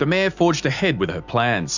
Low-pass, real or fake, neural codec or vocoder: 7.2 kHz; real; none